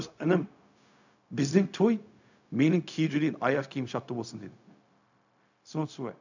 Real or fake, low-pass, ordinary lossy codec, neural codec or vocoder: fake; 7.2 kHz; none; codec, 16 kHz, 0.4 kbps, LongCat-Audio-Codec